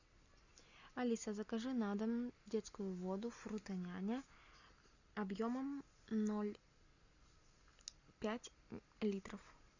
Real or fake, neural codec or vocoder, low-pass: real; none; 7.2 kHz